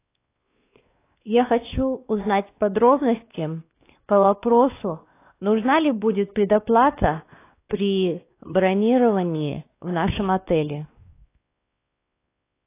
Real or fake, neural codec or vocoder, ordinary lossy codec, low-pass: fake; codec, 16 kHz, 2 kbps, X-Codec, WavLM features, trained on Multilingual LibriSpeech; AAC, 24 kbps; 3.6 kHz